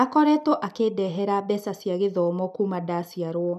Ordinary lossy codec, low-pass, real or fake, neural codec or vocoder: none; 14.4 kHz; real; none